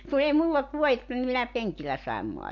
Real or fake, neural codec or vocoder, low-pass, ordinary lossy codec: real; none; 7.2 kHz; MP3, 48 kbps